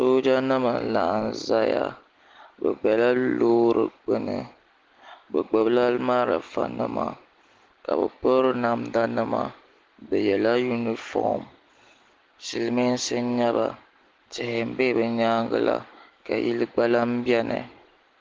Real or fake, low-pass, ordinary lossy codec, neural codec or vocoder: real; 7.2 kHz; Opus, 16 kbps; none